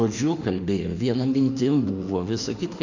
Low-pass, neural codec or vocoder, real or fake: 7.2 kHz; autoencoder, 48 kHz, 32 numbers a frame, DAC-VAE, trained on Japanese speech; fake